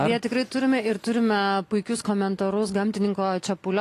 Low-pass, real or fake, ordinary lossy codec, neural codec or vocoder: 14.4 kHz; real; AAC, 48 kbps; none